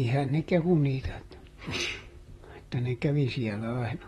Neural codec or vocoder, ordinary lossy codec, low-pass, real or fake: vocoder, 44.1 kHz, 128 mel bands, Pupu-Vocoder; AAC, 32 kbps; 19.8 kHz; fake